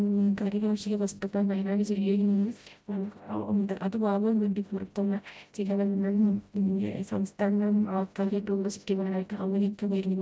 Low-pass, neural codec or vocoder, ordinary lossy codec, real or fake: none; codec, 16 kHz, 0.5 kbps, FreqCodec, smaller model; none; fake